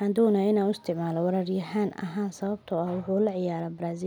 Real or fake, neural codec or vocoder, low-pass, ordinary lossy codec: real; none; 19.8 kHz; none